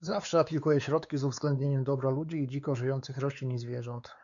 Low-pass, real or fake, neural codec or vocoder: 7.2 kHz; fake; codec, 16 kHz, 4 kbps, X-Codec, WavLM features, trained on Multilingual LibriSpeech